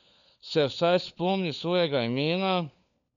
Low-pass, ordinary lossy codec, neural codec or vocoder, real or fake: 7.2 kHz; MP3, 96 kbps; codec, 16 kHz, 4 kbps, FunCodec, trained on LibriTTS, 50 frames a second; fake